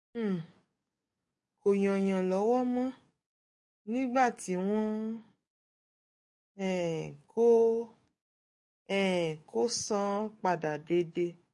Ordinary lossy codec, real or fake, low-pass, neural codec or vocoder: MP3, 48 kbps; fake; 10.8 kHz; codec, 44.1 kHz, 7.8 kbps, DAC